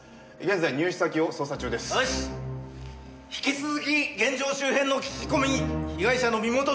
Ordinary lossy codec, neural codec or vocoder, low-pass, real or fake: none; none; none; real